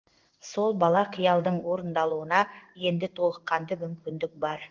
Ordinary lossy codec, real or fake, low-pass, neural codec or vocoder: Opus, 32 kbps; fake; 7.2 kHz; codec, 16 kHz in and 24 kHz out, 1 kbps, XY-Tokenizer